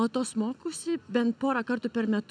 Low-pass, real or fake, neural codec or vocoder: 9.9 kHz; real; none